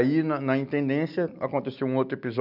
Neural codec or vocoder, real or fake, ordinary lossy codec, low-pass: none; real; none; 5.4 kHz